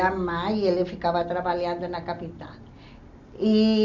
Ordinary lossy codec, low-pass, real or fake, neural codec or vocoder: none; 7.2 kHz; real; none